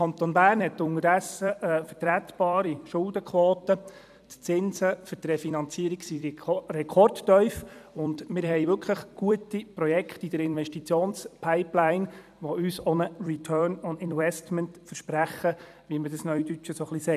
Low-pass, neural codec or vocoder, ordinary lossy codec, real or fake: 14.4 kHz; vocoder, 44.1 kHz, 128 mel bands every 256 samples, BigVGAN v2; none; fake